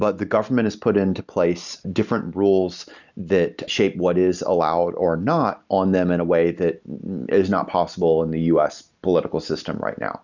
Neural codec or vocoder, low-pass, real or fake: none; 7.2 kHz; real